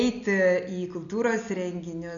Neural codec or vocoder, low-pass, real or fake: none; 7.2 kHz; real